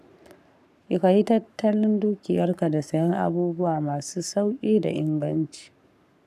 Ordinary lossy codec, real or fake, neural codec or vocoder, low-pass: none; fake; codec, 44.1 kHz, 7.8 kbps, Pupu-Codec; 14.4 kHz